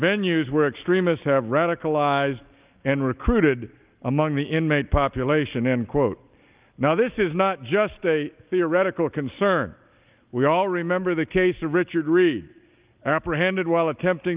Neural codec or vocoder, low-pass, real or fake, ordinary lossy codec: none; 3.6 kHz; real; Opus, 24 kbps